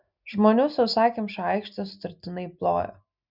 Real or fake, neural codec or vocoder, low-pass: real; none; 5.4 kHz